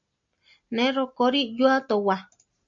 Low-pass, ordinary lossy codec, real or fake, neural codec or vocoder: 7.2 kHz; MP3, 48 kbps; real; none